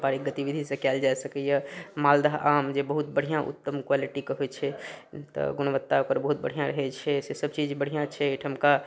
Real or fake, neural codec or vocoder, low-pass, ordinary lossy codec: real; none; none; none